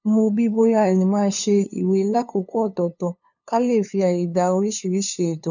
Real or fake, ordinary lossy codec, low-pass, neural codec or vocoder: fake; none; 7.2 kHz; codec, 16 kHz, 2 kbps, FunCodec, trained on LibriTTS, 25 frames a second